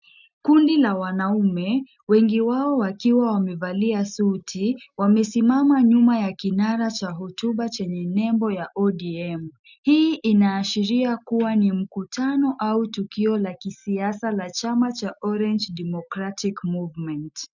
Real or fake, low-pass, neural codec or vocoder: real; 7.2 kHz; none